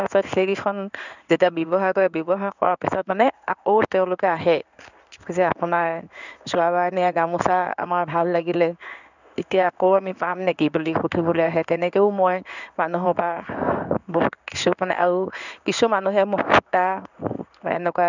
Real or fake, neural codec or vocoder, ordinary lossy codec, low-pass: fake; codec, 16 kHz in and 24 kHz out, 1 kbps, XY-Tokenizer; none; 7.2 kHz